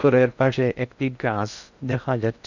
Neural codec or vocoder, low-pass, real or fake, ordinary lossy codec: codec, 16 kHz in and 24 kHz out, 0.6 kbps, FocalCodec, streaming, 2048 codes; 7.2 kHz; fake; none